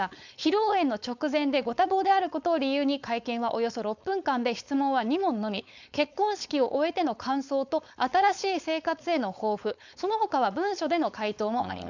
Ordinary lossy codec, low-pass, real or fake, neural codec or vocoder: none; 7.2 kHz; fake; codec, 16 kHz, 4.8 kbps, FACodec